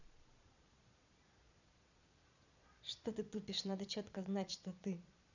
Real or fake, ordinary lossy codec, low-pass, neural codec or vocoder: fake; none; 7.2 kHz; vocoder, 22.05 kHz, 80 mel bands, Vocos